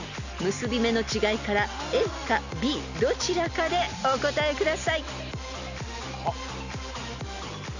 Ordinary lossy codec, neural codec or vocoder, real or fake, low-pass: none; none; real; 7.2 kHz